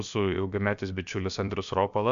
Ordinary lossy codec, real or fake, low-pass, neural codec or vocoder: Opus, 64 kbps; fake; 7.2 kHz; codec, 16 kHz, about 1 kbps, DyCAST, with the encoder's durations